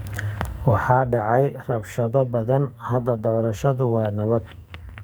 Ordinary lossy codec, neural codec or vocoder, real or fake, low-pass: none; codec, 44.1 kHz, 2.6 kbps, SNAC; fake; none